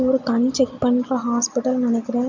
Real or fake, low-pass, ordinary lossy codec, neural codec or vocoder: real; 7.2 kHz; MP3, 64 kbps; none